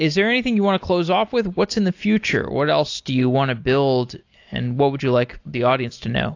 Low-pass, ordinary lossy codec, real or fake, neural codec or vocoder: 7.2 kHz; AAC, 48 kbps; real; none